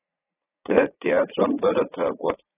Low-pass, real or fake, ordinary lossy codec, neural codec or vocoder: 3.6 kHz; fake; AAC, 16 kbps; vocoder, 44.1 kHz, 80 mel bands, Vocos